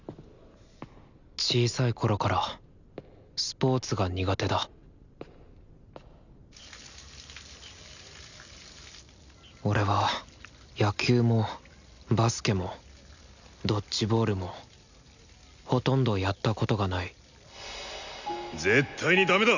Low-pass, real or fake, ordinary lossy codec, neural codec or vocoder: 7.2 kHz; real; none; none